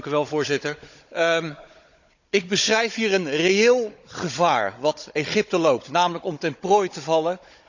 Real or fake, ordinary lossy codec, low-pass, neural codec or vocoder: fake; none; 7.2 kHz; codec, 16 kHz, 16 kbps, FunCodec, trained on Chinese and English, 50 frames a second